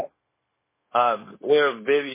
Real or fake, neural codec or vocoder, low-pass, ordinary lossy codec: fake; codec, 16 kHz, 0.9 kbps, LongCat-Audio-Codec; 3.6 kHz; MP3, 16 kbps